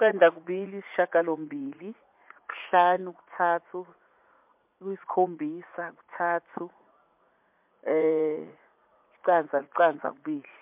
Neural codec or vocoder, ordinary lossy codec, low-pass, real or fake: vocoder, 44.1 kHz, 80 mel bands, Vocos; MP3, 32 kbps; 3.6 kHz; fake